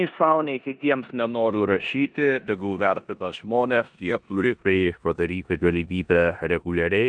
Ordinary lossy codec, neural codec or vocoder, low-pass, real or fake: MP3, 96 kbps; codec, 16 kHz in and 24 kHz out, 0.9 kbps, LongCat-Audio-Codec, four codebook decoder; 9.9 kHz; fake